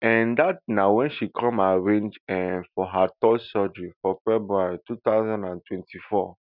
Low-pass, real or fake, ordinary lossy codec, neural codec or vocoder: 5.4 kHz; real; none; none